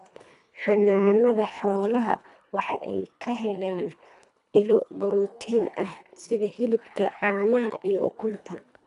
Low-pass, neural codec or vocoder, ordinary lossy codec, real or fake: 10.8 kHz; codec, 24 kHz, 1.5 kbps, HILCodec; none; fake